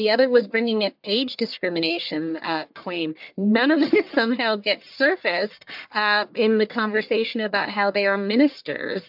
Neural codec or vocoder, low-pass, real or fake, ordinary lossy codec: codec, 44.1 kHz, 1.7 kbps, Pupu-Codec; 5.4 kHz; fake; MP3, 48 kbps